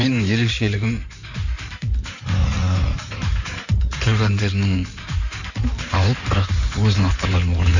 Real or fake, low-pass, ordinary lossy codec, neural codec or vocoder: fake; 7.2 kHz; none; codec, 16 kHz in and 24 kHz out, 2.2 kbps, FireRedTTS-2 codec